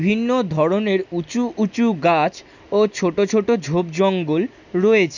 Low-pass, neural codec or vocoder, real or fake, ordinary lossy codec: 7.2 kHz; none; real; none